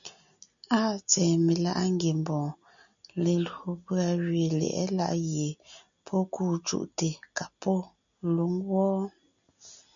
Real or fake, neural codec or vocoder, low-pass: real; none; 7.2 kHz